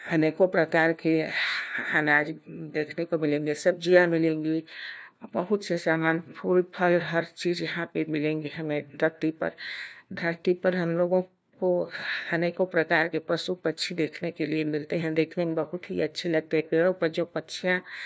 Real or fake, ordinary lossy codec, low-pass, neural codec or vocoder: fake; none; none; codec, 16 kHz, 1 kbps, FunCodec, trained on LibriTTS, 50 frames a second